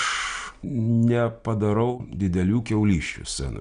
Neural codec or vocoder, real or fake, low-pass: none; real; 9.9 kHz